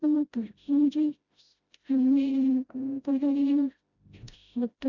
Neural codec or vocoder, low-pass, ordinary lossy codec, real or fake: codec, 16 kHz, 0.5 kbps, FreqCodec, smaller model; 7.2 kHz; Opus, 64 kbps; fake